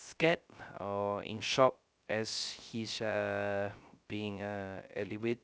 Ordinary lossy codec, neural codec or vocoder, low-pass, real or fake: none; codec, 16 kHz, 0.3 kbps, FocalCodec; none; fake